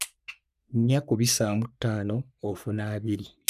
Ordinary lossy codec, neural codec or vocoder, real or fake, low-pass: none; codec, 44.1 kHz, 3.4 kbps, Pupu-Codec; fake; 14.4 kHz